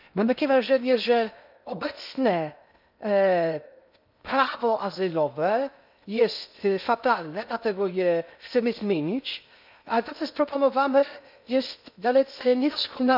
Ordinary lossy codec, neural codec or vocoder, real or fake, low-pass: none; codec, 16 kHz in and 24 kHz out, 0.6 kbps, FocalCodec, streaming, 2048 codes; fake; 5.4 kHz